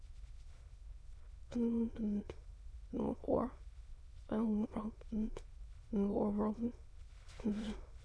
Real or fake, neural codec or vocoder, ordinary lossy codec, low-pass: fake; autoencoder, 22.05 kHz, a latent of 192 numbers a frame, VITS, trained on many speakers; none; none